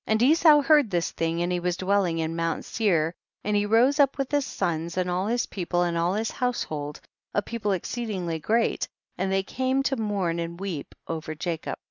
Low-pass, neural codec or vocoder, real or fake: 7.2 kHz; none; real